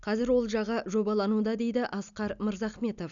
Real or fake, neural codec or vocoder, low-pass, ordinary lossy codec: real; none; 7.2 kHz; none